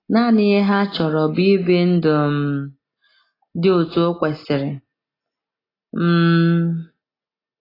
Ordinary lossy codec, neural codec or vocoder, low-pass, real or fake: AAC, 24 kbps; none; 5.4 kHz; real